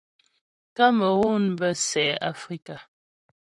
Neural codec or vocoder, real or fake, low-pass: vocoder, 44.1 kHz, 128 mel bands, Pupu-Vocoder; fake; 10.8 kHz